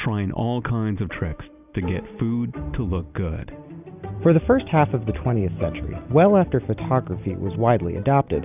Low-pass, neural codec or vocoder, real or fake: 3.6 kHz; none; real